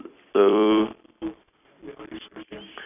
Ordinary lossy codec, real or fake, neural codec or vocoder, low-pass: none; fake; vocoder, 44.1 kHz, 128 mel bands every 256 samples, BigVGAN v2; 3.6 kHz